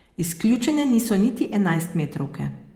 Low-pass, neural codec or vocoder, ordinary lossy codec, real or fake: 19.8 kHz; vocoder, 48 kHz, 128 mel bands, Vocos; Opus, 32 kbps; fake